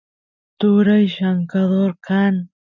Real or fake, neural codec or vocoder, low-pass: real; none; 7.2 kHz